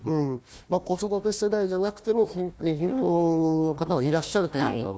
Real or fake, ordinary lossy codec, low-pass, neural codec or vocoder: fake; none; none; codec, 16 kHz, 1 kbps, FunCodec, trained on Chinese and English, 50 frames a second